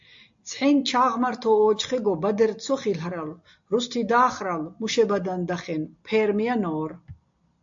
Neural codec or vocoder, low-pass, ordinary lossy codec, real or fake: none; 7.2 kHz; AAC, 64 kbps; real